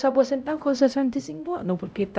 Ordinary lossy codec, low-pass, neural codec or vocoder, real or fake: none; none; codec, 16 kHz, 0.5 kbps, X-Codec, HuBERT features, trained on LibriSpeech; fake